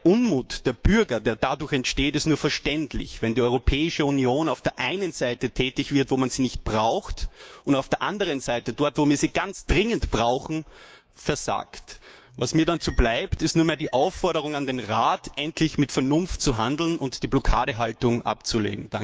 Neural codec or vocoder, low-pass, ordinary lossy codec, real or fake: codec, 16 kHz, 6 kbps, DAC; none; none; fake